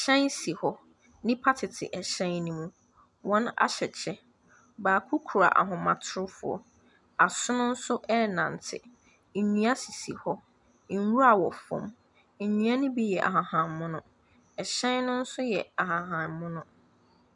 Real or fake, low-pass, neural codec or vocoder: real; 10.8 kHz; none